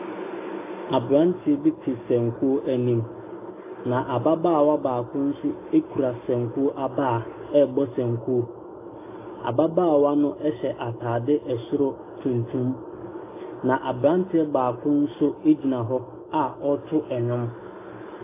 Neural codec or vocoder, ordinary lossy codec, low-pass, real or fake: none; AAC, 24 kbps; 3.6 kHz; real